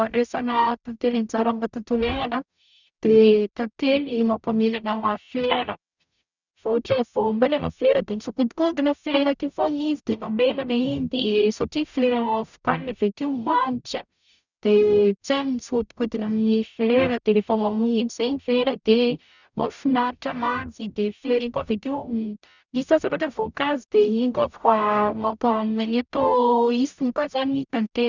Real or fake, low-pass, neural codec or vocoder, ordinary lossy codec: fake; 7.2 kHz; codec, 44.1 kHz, 0.9 kbps, DAC; none